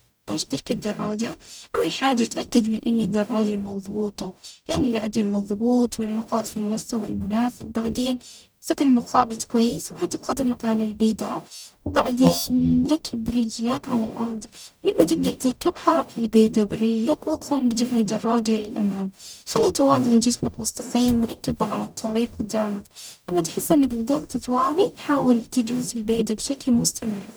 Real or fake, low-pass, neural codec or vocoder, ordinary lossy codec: fake; none; codec, 44.1 kHz, 0.9 kbps, DAC; none